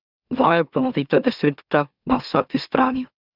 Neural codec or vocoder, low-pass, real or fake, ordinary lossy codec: autoencoder, 44.1 kHz, a latent of 192 numbers a frame, MeloTTS; 5.4 kHz; fake; none